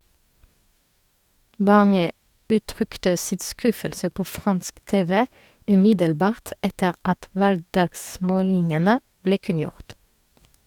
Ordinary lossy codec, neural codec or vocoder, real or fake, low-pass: none; codec, 44.1 kHz, 2.6 kbps, DAC; fake; 19.8 kHz